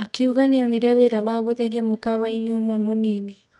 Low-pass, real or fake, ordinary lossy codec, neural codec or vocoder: 10.8 kHz; fake; none; codec, 24 kHz, 0.9 kbps, WavTokenizer, medium music audio release